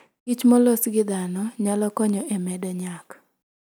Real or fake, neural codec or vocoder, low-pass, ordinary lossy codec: real; none; none; none